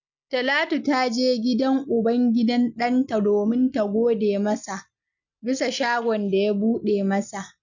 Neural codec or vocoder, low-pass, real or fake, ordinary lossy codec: none; 7.2 kHz; real; none